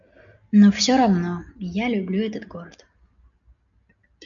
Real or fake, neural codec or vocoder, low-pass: real; none; 7.2 kHz